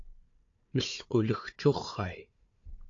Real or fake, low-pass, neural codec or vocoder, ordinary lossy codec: fake; 7.2 kHz; codec, 16 kHz, 4 kbps, FunCodec, trained on Chinese and English, 50 frames a second; AAC, 48 kbps